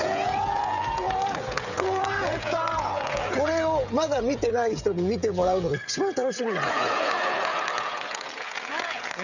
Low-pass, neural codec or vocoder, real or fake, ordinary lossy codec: 7.2 kHz; codec, 16 kHz, 16 kbps, FreqCodec, smaller model; fake; none